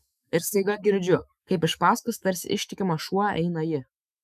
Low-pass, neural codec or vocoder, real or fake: 14.4 kHz; autoencoder, 48 kHz, 128 numbers a frame, DAC-VAE, trained on Japanese speech; fake